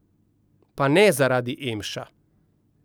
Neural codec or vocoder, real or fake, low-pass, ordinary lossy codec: none; real; none; none